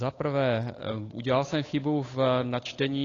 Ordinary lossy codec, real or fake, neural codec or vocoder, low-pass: AAC, 32 kbps; fake; codec, 16 kHz, 8 kbps, FunCodec, trained on Chinese and English, 25 frames a second; 7.2 kHz